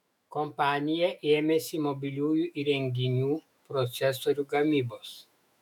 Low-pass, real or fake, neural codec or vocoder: 19.8 kHz; fake; autoencoder, 48 kHz, 128 numbers a frame, DAC-VAE, trained on Japanese speech